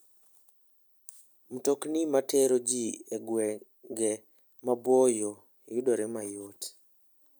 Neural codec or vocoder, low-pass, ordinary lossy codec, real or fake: none; none; none; real